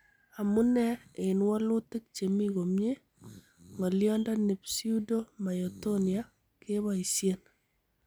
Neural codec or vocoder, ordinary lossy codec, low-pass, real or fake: none; none; none; real